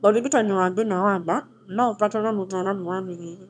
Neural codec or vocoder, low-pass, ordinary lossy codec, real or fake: autoencoder, 22.05 kHz, a latent of 192 numbers a frame, VITS, trained on one speaker; 9.9 kHz; none; fake